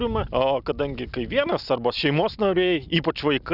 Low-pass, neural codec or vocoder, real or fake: 5.4 kHz; none; real